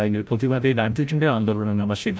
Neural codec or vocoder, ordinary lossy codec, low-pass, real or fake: codec, 16 kHz, 0.5 kbps, FreqCodec, larger model; none; none; fake